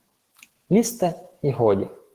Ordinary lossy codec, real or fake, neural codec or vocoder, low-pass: Opus, 16 kbps; fake; autoencoder, 48 kHz, 128 numbers a frame, DAC-VAE, trained on Japanese speech; 14.4 kHz